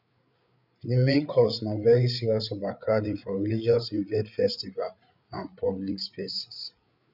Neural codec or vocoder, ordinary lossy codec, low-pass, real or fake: codec, 16 kHz, 8 kbps, FreqCodec, larger model; none; 5.4 kHz; fake